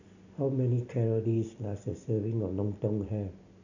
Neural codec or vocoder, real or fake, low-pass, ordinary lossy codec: none; real; 7.2 kHz; none